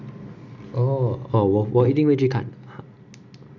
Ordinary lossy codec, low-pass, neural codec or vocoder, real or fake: none; 7.2 kHz; none; real